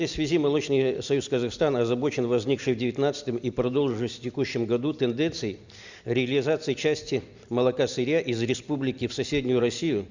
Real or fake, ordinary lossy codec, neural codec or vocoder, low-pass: real; Opus, 64 kbps; none; 7.2 kHz